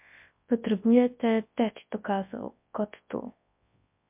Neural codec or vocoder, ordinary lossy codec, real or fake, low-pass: codec, 24 kHz, 0.9 kbps, WavTokenizer, large speech release; MP3, 32 kbps; fake; 3.6 kHz